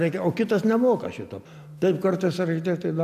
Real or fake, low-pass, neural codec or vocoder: real; 14.4 kHz; none